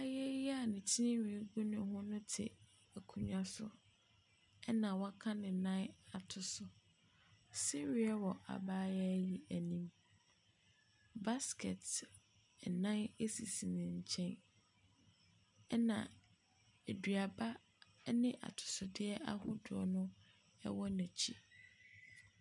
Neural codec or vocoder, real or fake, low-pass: none; real; 10.8 kHz